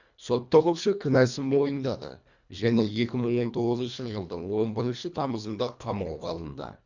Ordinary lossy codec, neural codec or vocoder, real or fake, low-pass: none; codec, 24 kHz, 1.5 kbps, HILCodec; fake; 7.2 kHz